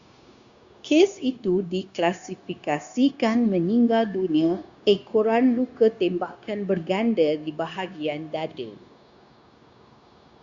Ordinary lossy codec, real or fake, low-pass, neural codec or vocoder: Opus, 64 kbps; fake; 7.2 kHz; codec, 16 kHz, 0.9 kbps, LongCat-Audio-Codec